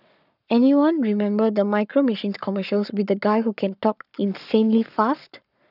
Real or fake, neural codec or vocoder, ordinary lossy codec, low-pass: fake; codec, 44.1 kHz, 7.8 kbps, Pupu-Codec; none; 5.4 kHz